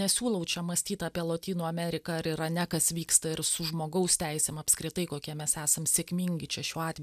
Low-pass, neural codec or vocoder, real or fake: 14.4 kHz; none; real